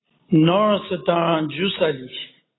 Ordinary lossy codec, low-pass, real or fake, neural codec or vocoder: AAC, 16 kbps; 7.2 kHz; real; none